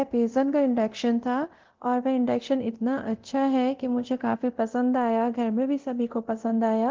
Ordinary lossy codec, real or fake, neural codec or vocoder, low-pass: Opus, 16 kbps; fake; codec, 24 kHz, 0.9 kbps, DualCodec; 7.2 kHz